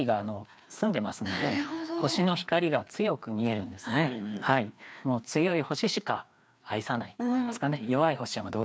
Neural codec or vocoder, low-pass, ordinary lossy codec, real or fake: codec, 16 kHz, 2 kbps, FreqCodec, larger model; none; none; fake